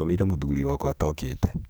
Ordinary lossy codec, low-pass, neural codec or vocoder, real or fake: none; none; codec, 44.1 kHz, 2.6 kbps, SNAC; fake